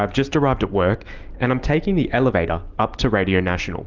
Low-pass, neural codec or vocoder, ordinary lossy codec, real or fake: 7.2 kHz; none; Opus, 24 kbps; real